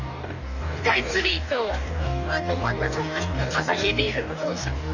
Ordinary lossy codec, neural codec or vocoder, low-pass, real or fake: none; codec, 44.1 kHz, 2.6 kbps, DAC; 7.2 kHz; fake